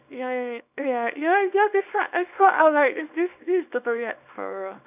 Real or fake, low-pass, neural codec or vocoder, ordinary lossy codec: fake; 3.6 kHz; codec, 24 kHz, 0.9 kbps, WavTokenizer, small release; none